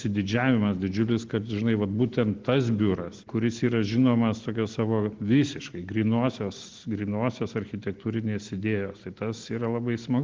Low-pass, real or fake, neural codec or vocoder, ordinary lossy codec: 7.2 kHz; real; none; Opus, 16 kbps